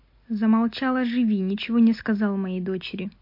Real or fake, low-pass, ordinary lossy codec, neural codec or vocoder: real; 5.4 kHz; MP3, 32 kbps; none